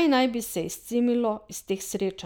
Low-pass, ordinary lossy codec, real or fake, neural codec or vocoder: none; none; real; none